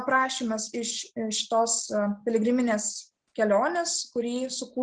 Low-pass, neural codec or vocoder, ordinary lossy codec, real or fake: 9.9 kHz; none; Opus, 16 kbps; real